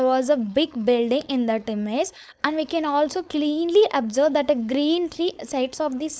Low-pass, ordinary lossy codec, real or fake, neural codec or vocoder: none; none; fake; codec, 16 kHz, 4.8 kbps, FACodec